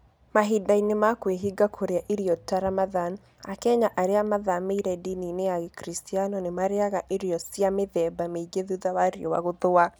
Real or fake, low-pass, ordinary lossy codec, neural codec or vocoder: real; none; none; none